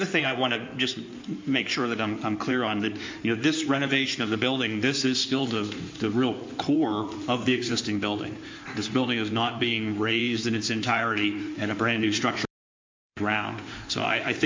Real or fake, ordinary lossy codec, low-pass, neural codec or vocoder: fake; MP3, 48 kbps; 7.2 kHz; codec, 16 kHz in and 24 kHz out, 2.2 kbps, FireRedTTS-2 codec